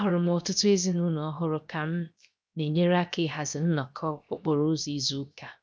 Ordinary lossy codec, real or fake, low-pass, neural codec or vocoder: none; fake; none; codec, 16 kHz, 0.7 kbps, FocalCodec